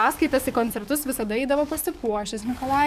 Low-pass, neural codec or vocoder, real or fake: 14.4 kHz; codec, 44.1 kHz, 7.8 kbps, DAC; fake